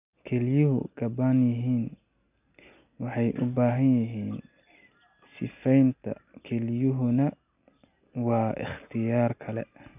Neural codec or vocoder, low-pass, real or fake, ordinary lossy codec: none; 3.6 kHz; real; none